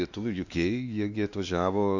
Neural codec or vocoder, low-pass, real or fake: codec, 16 kHz, 2 kbps, X-Codec, WavLM features, trained on Multilingual LibriSpeech; 7.2 kHz; fake